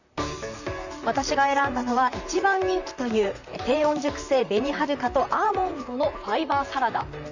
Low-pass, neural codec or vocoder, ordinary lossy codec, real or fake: 7.2 kHz; vocoder, 44.1 kHz, 128 mel bands, Pupu-Vocoder; none; fake